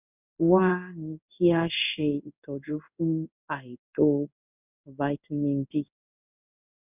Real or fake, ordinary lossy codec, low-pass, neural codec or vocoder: fake; none; 3.6 kHz; codec, 16 kHz in and 24 kHz out, 1 kbps, XY-Tokenizer